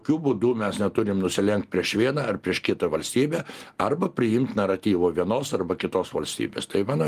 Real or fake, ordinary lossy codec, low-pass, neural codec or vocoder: real; Opus, 32 kbps; 14.4 kHz; none